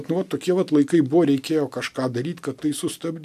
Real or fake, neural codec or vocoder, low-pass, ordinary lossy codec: real; none; 14.4 kHz; MP3, 96 kbps